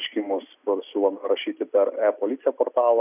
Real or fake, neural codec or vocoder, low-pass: real; none; 3.6 kHz